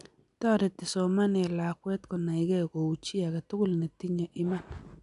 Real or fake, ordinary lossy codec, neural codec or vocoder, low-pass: real; none; none; 10.8 kHz